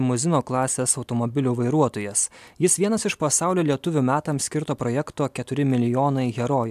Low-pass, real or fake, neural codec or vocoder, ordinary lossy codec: 14.4 kHz; real; none; AAC, 96 kbps